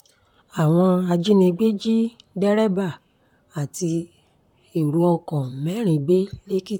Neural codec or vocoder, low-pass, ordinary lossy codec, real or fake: vocoder, 44.1 kHz, 128 mel bands, Pupu-Vocoder; 19.8 kHz; MP3, 96 kbps; fake